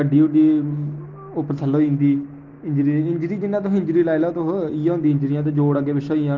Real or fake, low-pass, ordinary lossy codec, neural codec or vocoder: real; 7.2 kHz; Opus, 16 kbps; none